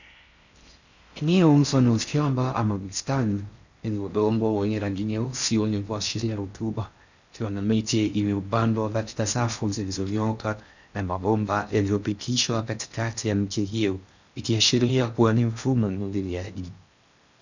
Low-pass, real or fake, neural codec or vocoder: 7.2 kHz; fake; codec, 16 kHz in and 24 kHz out, 0.6 kbps, FocalCodec, streaming, 4096 codes